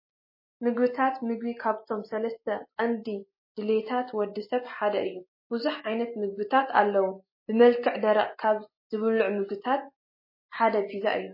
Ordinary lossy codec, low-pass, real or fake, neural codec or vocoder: MP3, 24 kbps; 5.4 kHz; real; none